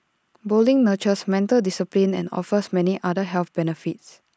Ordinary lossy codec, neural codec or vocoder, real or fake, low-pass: none; none; real; none